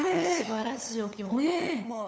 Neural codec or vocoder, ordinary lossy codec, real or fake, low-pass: codec, 16 kHz, 8 kbps, FunCodec, trained on LibriTTS, 25 frames a second; none; fake; none